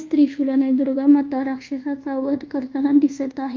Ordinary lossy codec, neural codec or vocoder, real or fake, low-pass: Opus, 32 kbps; codec, 24 kHz, 1.2 kbps, DualCodec; fake; 7.2 kHz